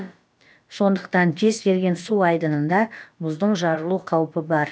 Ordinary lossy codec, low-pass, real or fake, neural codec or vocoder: none; none; fake; codec, 16 kHz, about 1 kbps, DyCAST, with the encoder's durations